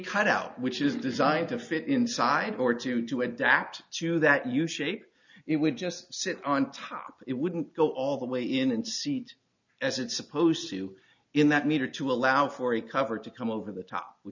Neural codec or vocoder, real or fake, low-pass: none; real; 7.2 kHz